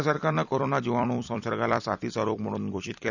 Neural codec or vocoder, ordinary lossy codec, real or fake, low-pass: vocoder, 44.1 kHz, 128 mel bands every 256 samples, BigVGAN v2; none; fake; 7.2 kHz